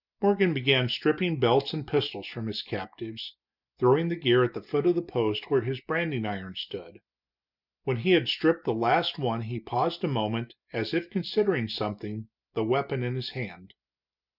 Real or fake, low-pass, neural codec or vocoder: real; 5.4 kHz; none